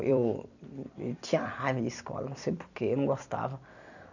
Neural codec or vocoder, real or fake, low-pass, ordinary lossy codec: vocoder, 44.1 kHz, 128 mel bands every 256 samples, BigVGAN v2; fake; 7.2 kHz; none